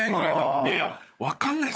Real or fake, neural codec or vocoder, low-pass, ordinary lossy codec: fake; codec, 16 kHz, 16 kbps, FunCodec, trained on LibriTTS, 50 frames a second; none; none